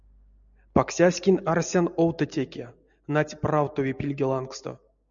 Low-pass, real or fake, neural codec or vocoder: 7.2 kHz; real; none